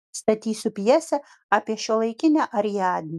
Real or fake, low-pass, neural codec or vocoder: real; 14.4 kHz; none